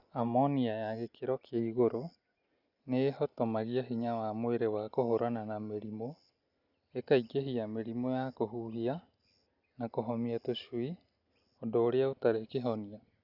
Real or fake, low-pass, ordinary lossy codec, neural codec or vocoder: real; 5.4 kHz; Opus, 64 kbps; none